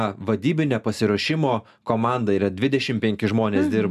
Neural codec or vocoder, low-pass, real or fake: none; 14.4 kHz; real